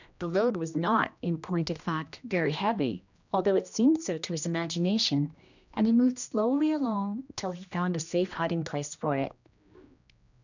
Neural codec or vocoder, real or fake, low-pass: codec, 16 kHz, 1 kbps, X-Codec, HuBERT features, trained on general audio; fake; 7.2 kHz